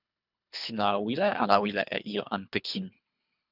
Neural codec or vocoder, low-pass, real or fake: codec, 24 kHz, 3 kbps, HILCodec; 5.4 kHz; fake